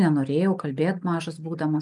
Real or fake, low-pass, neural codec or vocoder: real; 10.8 kHz; none